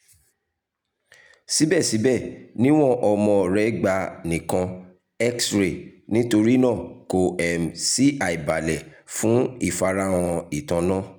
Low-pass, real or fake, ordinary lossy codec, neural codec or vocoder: none; real; none; none